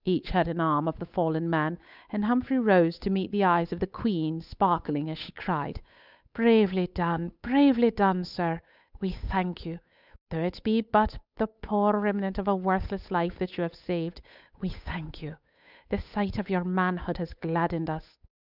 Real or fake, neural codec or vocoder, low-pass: fake; codec, 16 kHz, 8 kbps, FunCodec, trained on Chinese and English, 25 frames a second; 5.4 kHz